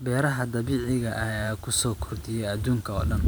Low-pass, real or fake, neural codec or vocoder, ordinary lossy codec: none; fake; vocoder, 44.1 kHz, 128 mel bands every 512 samples, BigVGAN v2; none